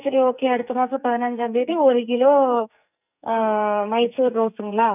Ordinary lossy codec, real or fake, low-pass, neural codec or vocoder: none; fake; 3.6 kHz; codec, 44.1 kHz, 2.6 kbps, SNAC